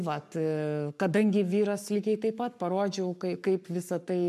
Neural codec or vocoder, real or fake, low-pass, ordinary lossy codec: codec, 44.1 kHz, 7.8 kbps, Pupu-Codec; fake; 14.4 kHz; MP3, 96 kbps